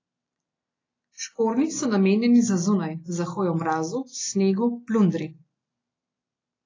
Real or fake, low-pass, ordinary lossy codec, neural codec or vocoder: real; 7.2 kHz; AAC, 32 kbps; none